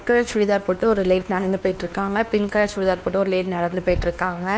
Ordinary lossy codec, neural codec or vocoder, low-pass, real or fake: none; codec, 16 kHz, 2 kbps, X-Codec, WavLM features, trained on Multilingual LibriSpeech; none; fake